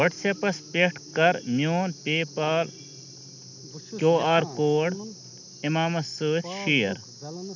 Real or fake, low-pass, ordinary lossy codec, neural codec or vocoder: real; 7.2 kHz; none; none